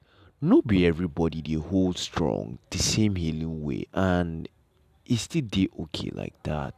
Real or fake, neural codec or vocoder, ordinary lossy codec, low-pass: real; none; none; 14.4 kHz